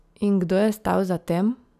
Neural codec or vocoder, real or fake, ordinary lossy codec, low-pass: none; real; none; 14.4 kHz